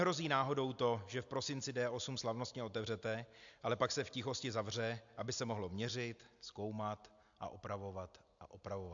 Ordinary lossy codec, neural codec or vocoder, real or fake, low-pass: AAC, 64 kbps; none; real; 7.2 kHz